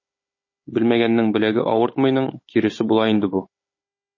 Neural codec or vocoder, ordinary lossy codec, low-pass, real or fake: codec, 16 kHz, 16 kbps, FunCodec, trained on Chinese and English, 50 frames a second; MP3, 32 kbps; 7.2 kHz; fake